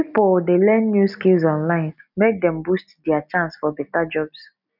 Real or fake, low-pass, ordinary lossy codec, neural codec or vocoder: real; 5.4 kHz; none; none